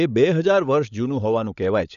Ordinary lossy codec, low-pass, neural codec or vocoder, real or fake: none; 7.2 kHz; codec, 16 kHz, 16 kbps, FunCodec, trained on LibriTTS, 50 frames a second; fake